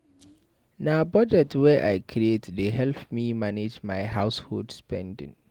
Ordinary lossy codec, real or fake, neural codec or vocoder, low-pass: Opus, 24 kbps; real; none; 19.8 kHz